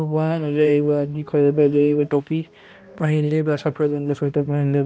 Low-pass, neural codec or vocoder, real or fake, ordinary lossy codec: none; codec, 16 kHz, 1 kbps, X-Codec, HuBERT features, trained on balanced general audio; fake; none